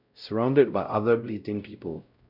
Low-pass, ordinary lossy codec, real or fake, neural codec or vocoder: 5.4 kHz; none; fake; codec, 16 kHz, 0.5 kbps, X-Codec, WavLM features, trained on Multilingual LibriSpeech